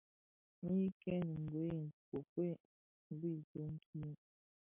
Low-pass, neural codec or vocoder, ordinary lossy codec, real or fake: 3.6 kHz; none; MP3, 32 kbps; real